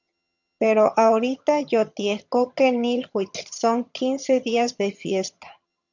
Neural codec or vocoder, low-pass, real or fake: vocoder, 22.05 kHz, 80 mel bands, HiFi-GAN; 7.2 kHz; fake